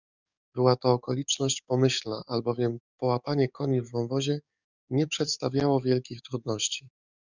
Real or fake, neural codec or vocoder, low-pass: fake; codec, 44.1 kHz, 7.8 kbps, DAC; 7.2 kHz